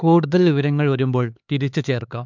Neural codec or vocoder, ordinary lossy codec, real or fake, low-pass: codec, 16 kHz, 4 kbps, X-Codec, HuBERT features, trained on LibriSpeech; MP3, 64 kbps; fake; 7.2 kHz